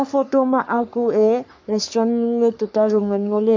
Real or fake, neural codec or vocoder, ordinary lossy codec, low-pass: fake; codec, 44.1 kHz, 3.4 kbps, Pupu-Codec; MP3, 64 kbps; 7.2 kHz